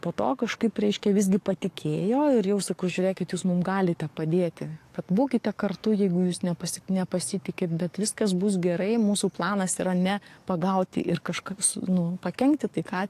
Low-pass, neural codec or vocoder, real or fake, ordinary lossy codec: 14.4 kHz; codec, 44.1 kHz, 7.8 kbps, DAC; fake; AAC, 64 kbps